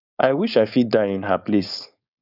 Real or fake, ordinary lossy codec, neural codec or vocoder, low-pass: fake; none; codec, 16 kHz, 4.8 kbps, FACodec; 5.4 kHz